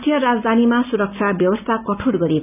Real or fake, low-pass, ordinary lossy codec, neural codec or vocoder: real; 3.6 kHz; none; none